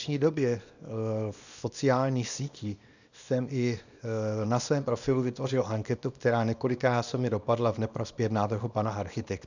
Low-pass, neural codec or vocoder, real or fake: 7.2 kHz; codec, 24 kHz, 0.9 kbps, WavTokenizer, small release; fake